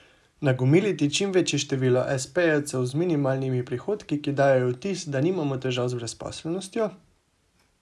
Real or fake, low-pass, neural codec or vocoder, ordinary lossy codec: real; none; none; none